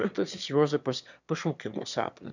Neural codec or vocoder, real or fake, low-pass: autoencoder, 22.05 kHz, a latent of 192 numbers a frame, VITS, trained on one speaker; fake; 7.2 kHz